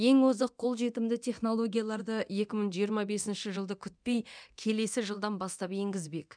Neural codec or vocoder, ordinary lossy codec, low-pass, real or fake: codec, 24 kHz, 0.9 kbps, DualCodec; none; 9.9 kHz; fake